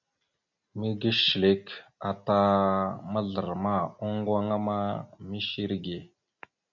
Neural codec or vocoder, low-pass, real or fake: none; 7.2 kHz; real